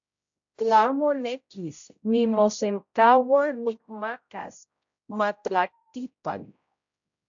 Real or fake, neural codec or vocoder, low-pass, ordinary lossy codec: fake; codec, 16 kHz, 0.5 kbps, X-Codec, HuBERT features, trained on general audio; 7.2 kHz; AAC, 64 kbps